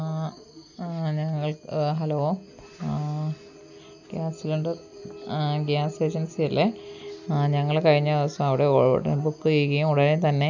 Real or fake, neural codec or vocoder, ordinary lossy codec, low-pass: real; none; none; 7.2 kHz